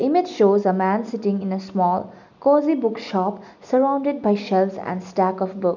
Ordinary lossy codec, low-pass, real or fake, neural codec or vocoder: none; 7.2 kHz; real; none